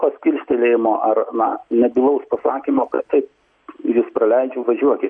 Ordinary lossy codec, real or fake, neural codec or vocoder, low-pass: MP3, 48 kbps; real; none; 9.9 kHz